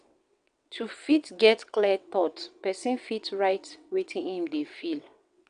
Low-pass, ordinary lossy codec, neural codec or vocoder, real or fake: 9.9 kHz; none; vocoder, 22.05 kHz, 80 mel bands, WaveNeXt; fake